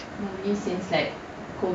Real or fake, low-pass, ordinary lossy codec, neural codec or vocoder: real; none; none; none